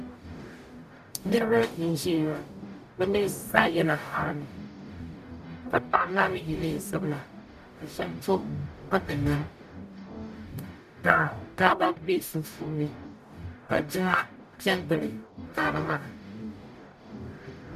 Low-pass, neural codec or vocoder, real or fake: 14.4 kHz; codec, 44.1 kHz, 0.9 kbps, DAC; fake